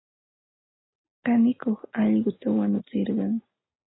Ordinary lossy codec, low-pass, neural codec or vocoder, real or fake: AAC, 16 kbps; 7.2 kHz; none; real